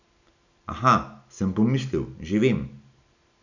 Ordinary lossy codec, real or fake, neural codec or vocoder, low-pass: none; real; none; 7.2 kHz